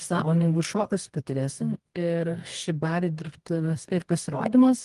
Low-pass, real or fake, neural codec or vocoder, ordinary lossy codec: 10.8 kHz; fake; codec, 24 kHz, 0.9 kbps, WavTokenizer, medium music audio release; Opus, 24 kbps